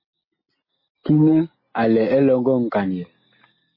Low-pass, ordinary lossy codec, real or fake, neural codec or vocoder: 5.4 kHz; MP3, 24 kbps; real; none